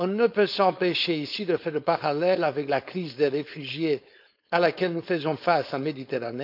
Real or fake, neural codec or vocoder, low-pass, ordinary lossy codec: fake; codec, 16 kHz, 4.8 kbps, FACodec; 5.4 kHz; none